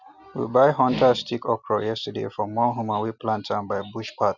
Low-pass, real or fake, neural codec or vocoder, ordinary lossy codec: 7.2 kHz; real; none; none